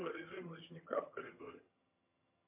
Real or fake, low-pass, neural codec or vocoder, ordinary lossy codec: fake; 3.6 kHz; vocoder, 22.05 kHz, 80 mel bands, HiFi-GAN; MP3, 32 kbps